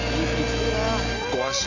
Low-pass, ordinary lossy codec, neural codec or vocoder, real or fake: 7.2 kHz; none; none; real